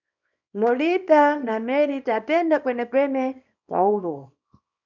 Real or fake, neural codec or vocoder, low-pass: fake; codec, 24 kHz, 0.9 kbps, WavTokenizer, small release; 7.2 kHz